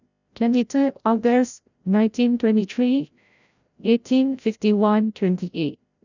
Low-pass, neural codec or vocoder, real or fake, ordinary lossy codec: 7.2 kHz; codec, 16 kHz, 0.5 kbps, FreqCodec, larger model; fake; none